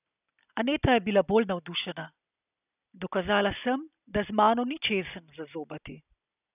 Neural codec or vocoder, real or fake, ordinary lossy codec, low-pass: none; real; none; 3.6 kHz